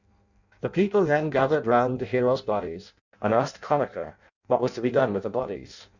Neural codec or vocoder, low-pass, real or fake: codec, 16 kHz in and 24 kHz out, 0.6 kbps, FireRedTTS-2 codec; 7.2 kHz; fake